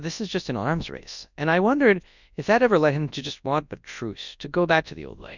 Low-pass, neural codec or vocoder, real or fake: 7.2 kHz; codec, 24 kHz, 0.9 kbps, WavTokenizer, large speech release; fake